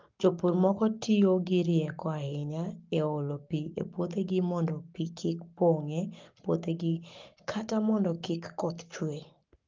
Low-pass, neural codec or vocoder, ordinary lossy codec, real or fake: 7.2 kHz; codec, 44.1 kHz, 7.8 kbps, Pupu-Codec; Opus, 32 kbps; fake